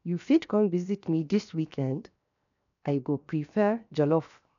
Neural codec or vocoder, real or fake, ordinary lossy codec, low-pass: codec, 16 kHz, 0.7 kbps, FocalCodec; fake; none; 7.2 kHz